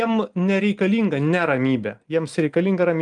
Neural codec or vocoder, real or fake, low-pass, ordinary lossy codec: none; real; 10.8 kHz; Opus, 32 kbps